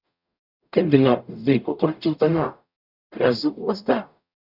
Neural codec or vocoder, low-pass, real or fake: codec, 44.1 kHz, 0.9 kbps, DAC; 5.4 kHz; fake